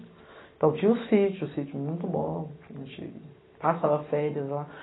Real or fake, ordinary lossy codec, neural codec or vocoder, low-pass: real; AAC, 16 kbps; none; 7.2 kHz